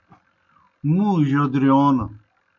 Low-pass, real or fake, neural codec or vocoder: 7.2 kHz; real; none